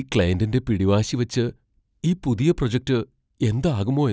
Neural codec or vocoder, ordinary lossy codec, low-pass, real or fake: none; none; none; real